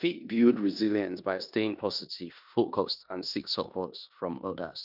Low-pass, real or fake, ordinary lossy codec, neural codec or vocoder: 5.4 kHz; fake; none; codec, 16 kHz in and 24 kHz out, 0.9 kbps, LongCat-Audio-Codec, four codebook decoder